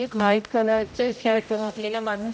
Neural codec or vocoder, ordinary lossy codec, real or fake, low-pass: codec, 16 kHz, 0.5 kbps, X-Codec, HuBERT features, trained on general audio; none; fake; none